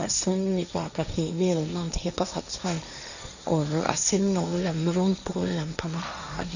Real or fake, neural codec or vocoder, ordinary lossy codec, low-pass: fake; codec, 16 kHz, 1.1 kbps, Voila-Tokenizer; none; 7.2 kHz